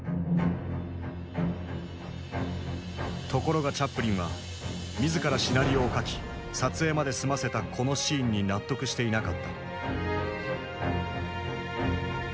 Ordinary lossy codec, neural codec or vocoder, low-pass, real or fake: none; none; none; real